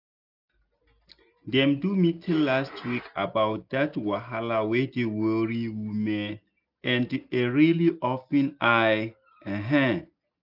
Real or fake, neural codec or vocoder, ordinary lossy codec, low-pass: real; none; none; 5.4 kHz